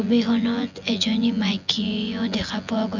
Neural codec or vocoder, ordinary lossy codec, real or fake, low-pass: vocoder, 24 kHz, 100 mel bands, Vocos; none; fake; 7.2 kHz